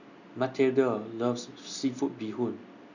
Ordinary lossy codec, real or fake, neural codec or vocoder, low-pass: none; real; none; 7.2 kHz